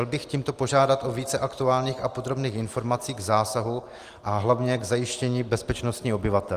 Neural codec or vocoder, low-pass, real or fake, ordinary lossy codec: none; 14.4 kHz; real; Opus, 24 kbps